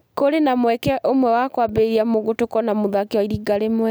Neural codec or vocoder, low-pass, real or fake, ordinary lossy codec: none; none; real; none